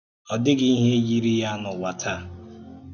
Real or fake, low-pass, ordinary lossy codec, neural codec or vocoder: real; 7.2 kHz; none; none